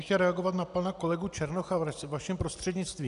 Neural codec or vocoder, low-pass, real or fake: none; 10.8 kHz; real